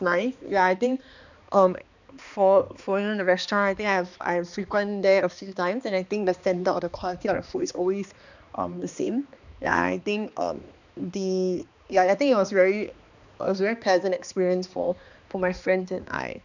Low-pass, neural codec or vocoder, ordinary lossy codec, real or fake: 7.2 kHz; codec, 16 kHz, 2 kbps, X-Codec, HuBERT features, trained on balanced general audio; none; fake